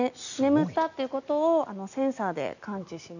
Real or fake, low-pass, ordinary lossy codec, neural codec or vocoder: real; 7.2 kHz; none; none